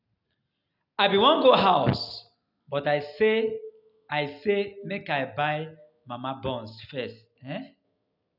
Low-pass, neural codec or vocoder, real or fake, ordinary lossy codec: 5.4 kHz; none; real; none